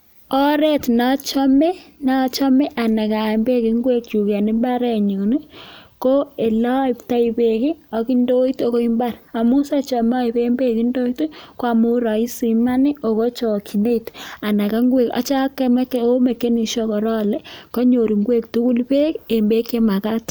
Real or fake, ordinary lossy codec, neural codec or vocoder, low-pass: real; none; none; none